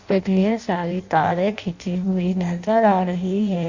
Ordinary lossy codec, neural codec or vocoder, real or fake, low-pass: Opus, 64 kbps; codec, 16 kHz in and 24 kHz out, 0.6 kbps, FireRedTTS-2 codec; fake; 7.2 kHz